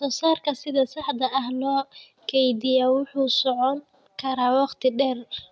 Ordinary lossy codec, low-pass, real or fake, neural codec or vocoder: none; none; real; none